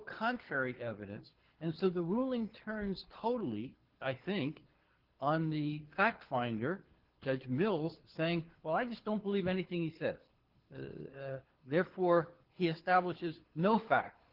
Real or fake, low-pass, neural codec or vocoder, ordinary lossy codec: fake; 5.4 kHz; codec, 24 kHz, 6 kbps, HILCodec; Opus, 16 kbps